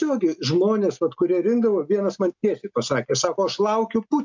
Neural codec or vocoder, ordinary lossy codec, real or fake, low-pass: none; MP3, 64 kbps; real; 7.2 kHz